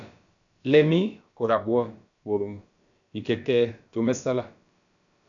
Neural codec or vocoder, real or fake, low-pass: codec, 16 kHz, about 1 kbps, DyCAST, with the encoder's durations; fake; 7.2 kHz